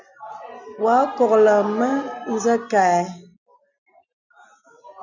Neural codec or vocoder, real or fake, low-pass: none; real; 7.2 kHz